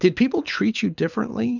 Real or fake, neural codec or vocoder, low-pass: fake; codec, 24 kHz, 6 kbps, HILCodec; 7.2 kHz